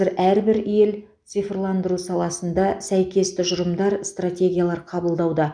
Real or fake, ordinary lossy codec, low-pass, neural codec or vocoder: real; none; 9.9 kHz; none